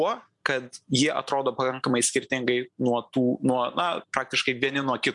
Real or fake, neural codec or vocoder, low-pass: real; none; 10.8 kHz